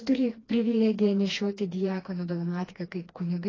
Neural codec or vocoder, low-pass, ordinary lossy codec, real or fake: codec, 16 kHz, 2 kbps, FreqCodec, smaller model; 7.2 kHz; AAC, 32 kbps; fake